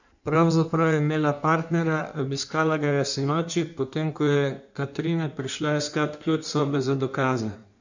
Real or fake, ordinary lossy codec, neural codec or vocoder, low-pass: fake; none; codec, 16 kHz in and 24 kHz out, 1.1 kbps, FireRedTTS-2 codec; 7.2 kHz